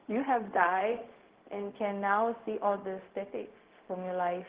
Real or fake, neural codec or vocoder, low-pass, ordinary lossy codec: fake; codec, 16 kHz, 0.4 kbps, LongCat-Audio-Codec; 3.6 kHz; Opus, 16 kbps